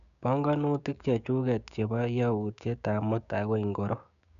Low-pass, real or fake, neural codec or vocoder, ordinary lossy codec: 7.2 kHz; fake; codec, 16 kHz, 6 kbps, DAC; none